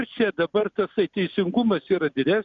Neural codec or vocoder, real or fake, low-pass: none; real; 7.2 kHz